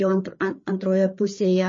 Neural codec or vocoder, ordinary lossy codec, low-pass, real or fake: codec, 16 kHz, 4 kbps, FreqCodec, larger model; MP3, 32 kbps; 7.2 kHz; fake